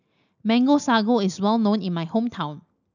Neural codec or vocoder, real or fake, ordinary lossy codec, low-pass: none; real; none; 7.2 kHz